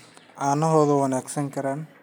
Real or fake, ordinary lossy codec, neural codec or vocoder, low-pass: real; none; none; none